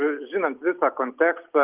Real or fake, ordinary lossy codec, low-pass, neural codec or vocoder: real; Opus, 32 kbps; 3.6 kHz; none